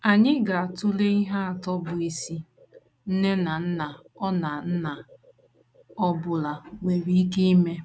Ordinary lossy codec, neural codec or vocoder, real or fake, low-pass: none; none; real; none